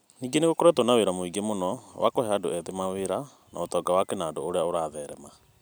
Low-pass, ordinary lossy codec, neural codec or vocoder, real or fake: none; none; none; real